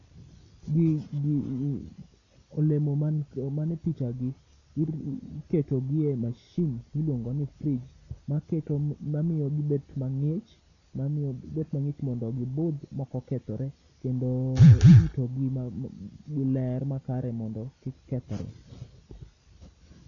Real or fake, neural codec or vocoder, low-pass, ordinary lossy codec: real; none; 7.2 kHz; none